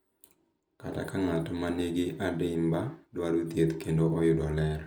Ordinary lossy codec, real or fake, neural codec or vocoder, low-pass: none; real; none; none